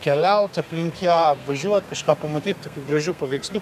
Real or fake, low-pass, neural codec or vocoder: fake; 14.4 kHz; codec, 32 kHz, 1.9 kbps, SNAC